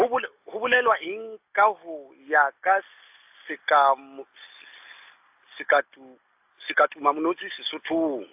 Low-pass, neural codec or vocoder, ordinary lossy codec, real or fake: 3.6 kHz; none; AAC, 32 kbps; real